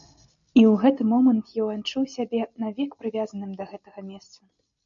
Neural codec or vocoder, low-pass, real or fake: none; 7.2 kHz; real